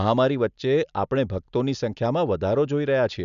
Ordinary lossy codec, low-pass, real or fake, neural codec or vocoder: none; 7.2 kHz; real; none